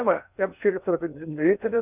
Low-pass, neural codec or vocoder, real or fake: 3.6 kHz; codec, 16 kHz in and 24 kHz out, 0.8 kbps, FocalCodec, streaming, 65536 codes; fake